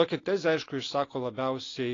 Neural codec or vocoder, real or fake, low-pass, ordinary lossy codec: none; real; 7.2 kHz; AAC, 32 kbps